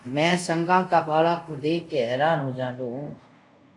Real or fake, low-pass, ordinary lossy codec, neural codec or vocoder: fake; 10.8 kHz; AAC, 64 kbps; codec, 24 kHz, 0.5 kbps, DualCodec